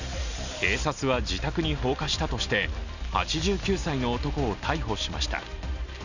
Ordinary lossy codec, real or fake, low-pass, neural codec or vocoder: none; real; 7.2 kHz; none